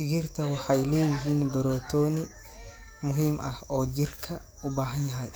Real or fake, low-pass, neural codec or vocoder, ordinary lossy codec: fake; none; codec, 44.1 kHz, 7.8 kbps, DAC; none